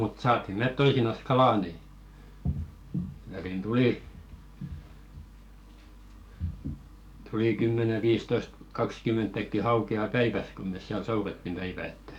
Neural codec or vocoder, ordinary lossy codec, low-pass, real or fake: codec, 44.1 kHz, 7.8 kbps, DAC; none; 19.8 kHz; fake